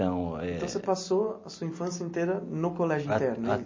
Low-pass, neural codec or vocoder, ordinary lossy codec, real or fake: 7.2 kHz; none; MP3, 32 kbps; real